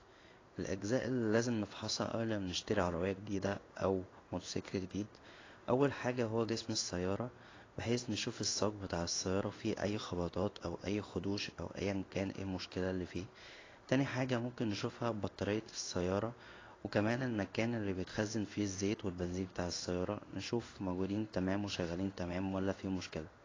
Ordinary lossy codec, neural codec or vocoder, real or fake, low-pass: AAC, 32 kbps; codec, 16 kHz in and 24 kHz out, 1 kbps, XY-Tokenizer; fake; 7.2 kHz